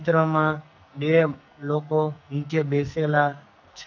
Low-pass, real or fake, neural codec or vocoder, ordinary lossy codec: 7.2 kHz; fake; codec, 44.1 kHz, 2.6 kbps, SNAC; none